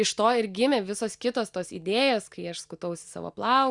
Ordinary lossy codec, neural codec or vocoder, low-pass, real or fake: Opus, 64 kbps; none; 10.8 kHz; real